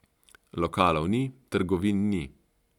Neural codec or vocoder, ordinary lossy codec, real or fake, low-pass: none; none; real; 19.8 kHz